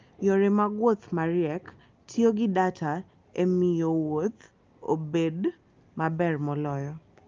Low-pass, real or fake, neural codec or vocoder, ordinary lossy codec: 7.2 kHz; real; none; Opus, 24 kbps